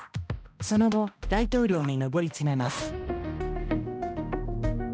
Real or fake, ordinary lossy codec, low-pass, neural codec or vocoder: fake; none; none; codec, 16 kHz, 1 kbps, X-Codec, HuBERT features, trained on balanced general audio